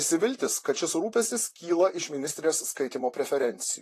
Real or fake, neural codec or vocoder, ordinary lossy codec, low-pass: fake; vocoder, 44.1 kHz, 128 mel bands, Pupu-Vocoder; AAC, 48 kbps; 14.4 kHz